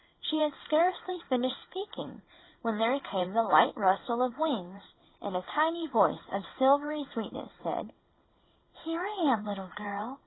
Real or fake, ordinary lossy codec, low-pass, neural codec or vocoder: fake; AAC, 16 kbps; 7.2 kHz; vocoder, 44.1 kHz, 128 mel bands every 512 samples, BigVGAN v2